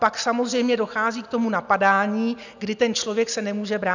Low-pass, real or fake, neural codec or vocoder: 7.2 kHz; real; none